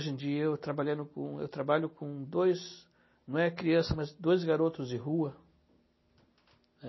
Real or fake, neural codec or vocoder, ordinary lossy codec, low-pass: real; none; MP3, 24 kbps; 7.2 kHz